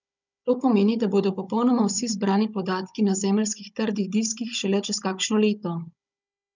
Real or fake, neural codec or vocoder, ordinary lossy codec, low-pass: fake; codec, 16 kHz, 16 kbps, FunCodec, trained on Chinese and English, 50 frames a second; none; 7.2 kHz